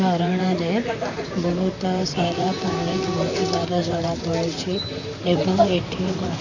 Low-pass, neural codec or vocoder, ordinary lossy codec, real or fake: 7.2 kHz; vocoder, 44.1 kHz, 128 mel bands, Pupu-Vocoder; none; fake